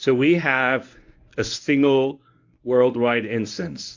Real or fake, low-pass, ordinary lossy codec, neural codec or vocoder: fake; 7.2 kHz; AAC, 48 kbps; codec, 24 kHz, 0.9 kbps, WavTokenizer, medium speech release version 1